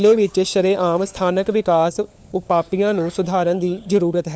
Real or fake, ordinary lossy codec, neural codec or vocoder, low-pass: fake; none; codec, 16 kHz, 4 kbps, FunCodec, trained on LibriTTS, 50 frames a second; none